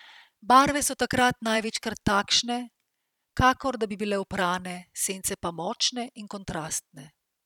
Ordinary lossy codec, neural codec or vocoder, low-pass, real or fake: none; vocoder, 44.1 kHz, 128 mel bands every 512 samples, BigVGAN v2; 19.8 kHz; fake